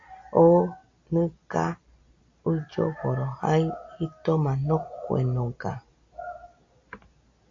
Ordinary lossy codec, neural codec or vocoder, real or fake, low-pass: AAC, 48 kbps; none; real; 7.2 kHz